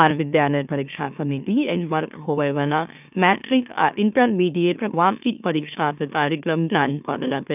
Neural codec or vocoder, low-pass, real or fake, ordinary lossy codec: autoencoder, 44.1 kHz, a latent of 192 numbers a frame, MeloTTS; 3.6 kHz; fake; none